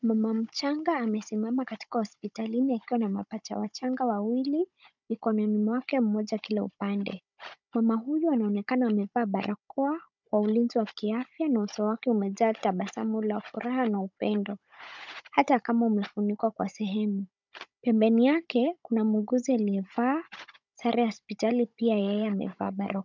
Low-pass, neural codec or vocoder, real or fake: 7.2 kHz; codec, 16 kHz, 16 kbps, FunCodec, trained on Chinese and English, 50 frames a second; fake